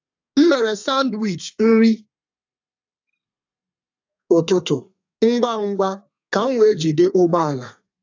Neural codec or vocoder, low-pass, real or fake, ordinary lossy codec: codec, 32 kHz, 1.9 kbps, SNAC; 7.2 kHz; fake; AAC, 48 kbps